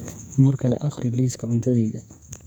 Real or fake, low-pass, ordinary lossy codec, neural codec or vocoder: fake; none; none; codec, 44.1 kHz, 2.6 kbps, SNAC